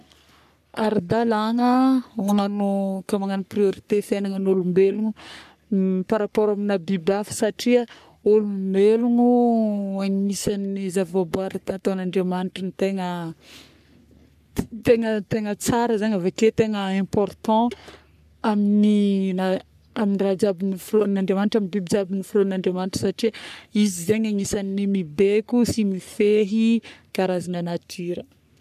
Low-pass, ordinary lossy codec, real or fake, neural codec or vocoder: 14.4 kHz; none; fake; codec, 44.1 kHz, 3.4 kbps, Pupu-Codec